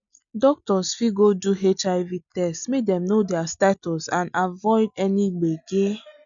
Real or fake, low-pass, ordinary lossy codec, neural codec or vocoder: real; 7.2 kHz; none; none